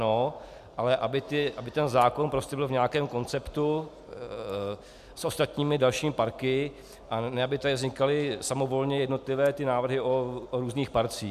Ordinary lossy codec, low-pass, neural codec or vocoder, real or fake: MP3, 96 kbps; 14.4 kHz; none; real